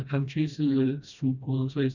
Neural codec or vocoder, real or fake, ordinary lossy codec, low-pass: codec, 16 kHz, 1 kbps, FreqCodec, smaller model; fake; none; 7.2 kHz